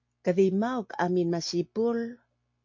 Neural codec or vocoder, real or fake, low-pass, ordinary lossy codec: none; real; 7.2 kHz; MP3, 48 kbps